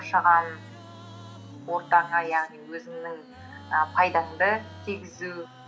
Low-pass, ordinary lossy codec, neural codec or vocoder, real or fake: none; none; none; real